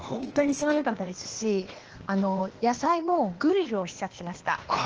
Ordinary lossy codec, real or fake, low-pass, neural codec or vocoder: Opus, 16 kbps; fake; 7.2 kHz; codec, 16 kHz, 0.8 kbps, ZipCodec